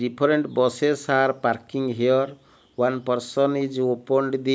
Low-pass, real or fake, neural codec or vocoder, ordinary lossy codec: none; real; none; none